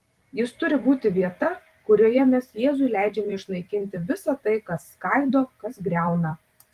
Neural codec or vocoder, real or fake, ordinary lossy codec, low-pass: vocoder, 44.1 kHz, 128 mel bands every 256 samples, BigVGAN v2; fake; Opus, 24 kbps; 14.4 kHz